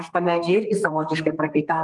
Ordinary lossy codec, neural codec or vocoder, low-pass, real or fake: Opus, 32 kbps; codec, 32 kHz, 1.9 kbps, SNAC; 10.8 kHz; fake